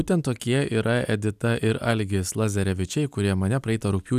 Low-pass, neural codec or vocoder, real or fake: 14.4 kHz; none; real